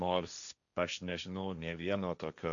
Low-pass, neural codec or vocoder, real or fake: 7.2 kHz; codec, 16 kHz, 1.1 kbps, Voila-Tokenizer; fake